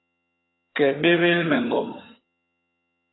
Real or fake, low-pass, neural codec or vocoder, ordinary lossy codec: fake; 7.2 kHz; vocoder, 22.05 kHz, 80 mel bands, HiFi-GAN; AAC, 16 kbps